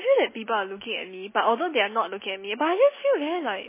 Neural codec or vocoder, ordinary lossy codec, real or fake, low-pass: none; MP3, 16 kbps; real; 3.6 kHz